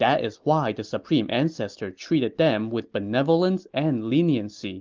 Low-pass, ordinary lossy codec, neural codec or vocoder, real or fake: 7.2 kHz; Opus, 32 kbps; none; real